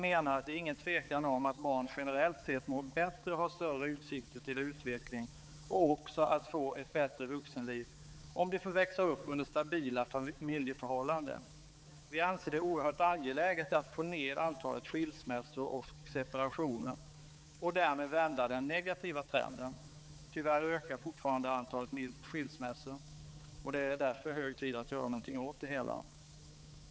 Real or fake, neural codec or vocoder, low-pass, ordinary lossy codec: fake; codec, 16 kHz, 4 kbps, X-Codec, HuBERT features, trained on balanced general audio; none; none